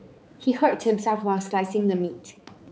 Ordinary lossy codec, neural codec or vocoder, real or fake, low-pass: none; codec, 16 kHz, 4 kbps, X-Codec, HuBERT features, trained on balanced general audio; fake; none